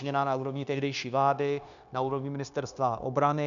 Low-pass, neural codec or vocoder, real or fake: 7.2 kHz; codec, 16 kHz, 0.9 kbps, LongCat-Audio-Codec; fake